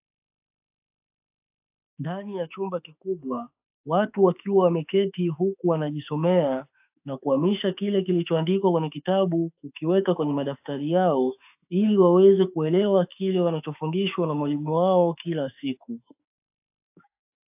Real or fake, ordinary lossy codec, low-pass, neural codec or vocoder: fake; AAC, 32 kbps; 3.6 kHz; autoencoder, 48 kHz, 32 numbers a frame, DAC-VAE, trained on Japanese speech